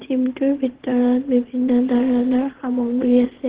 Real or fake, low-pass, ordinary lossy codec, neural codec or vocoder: fake; 3.6 kHz; Opus, 16 kbps; vocoder, 22.05 kHz, 80 mel bands, Vocos